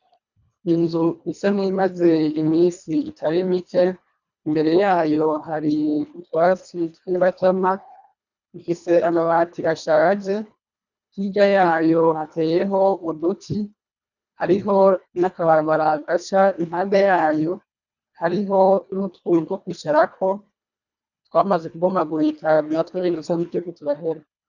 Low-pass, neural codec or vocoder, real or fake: 7.2 kHz; codec, 24 kHz, 1.5 kbps, HILCodec; fake